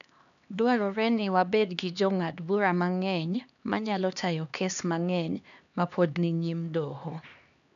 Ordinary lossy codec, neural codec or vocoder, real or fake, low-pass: none; codec, 16 kHz, 2 kbps, X-Codec, HuBERT features, trained on LibriSpeech; fake; 7.2 kHz